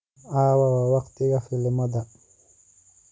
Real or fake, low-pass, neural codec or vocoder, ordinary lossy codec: real; none; none; none